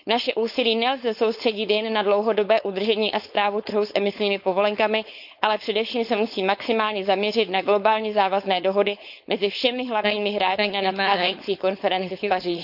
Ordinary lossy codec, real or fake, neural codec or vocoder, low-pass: none; fake; codec, 16 kHz, 4.8 kbps, FACodec; 5.4 kHz